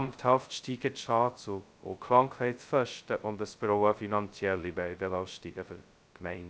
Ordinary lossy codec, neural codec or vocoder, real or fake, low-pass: none; codec, 16 kHz, 0.2 kbps, FocalCodec; fake; none